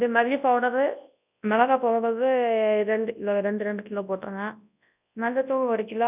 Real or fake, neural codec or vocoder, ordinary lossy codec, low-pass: fake; codec, 24 kHz, 0.9 kbps, WavTokenizer, large speech release; none; 3.6 kHz